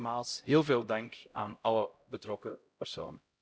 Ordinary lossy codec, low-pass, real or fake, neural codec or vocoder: none; none; fake; codec, 16 kHz, 0.5 kbps, X-Codec, HuBERT features, trained on LibriSpeech